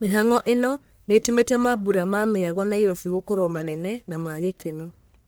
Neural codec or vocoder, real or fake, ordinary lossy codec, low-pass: codec, 44.1 kHz, 1.7 kbps, Pupu-Codec; fake; none; none